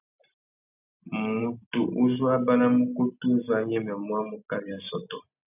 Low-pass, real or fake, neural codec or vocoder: 3.6 kHz; real; none